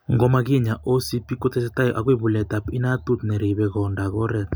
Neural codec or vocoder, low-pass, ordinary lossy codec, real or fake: none; none; none; real